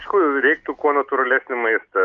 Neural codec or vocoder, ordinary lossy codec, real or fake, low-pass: none; Opus, 32 kbps; real; 7.2 kHz